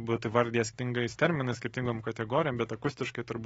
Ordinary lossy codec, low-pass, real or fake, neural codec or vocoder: AAC, 24 kbps; 19.8 kHz; fake; autoencoder, 48 kHz, 128 numbers a frame, DAC-VAE, trained on Japanese speech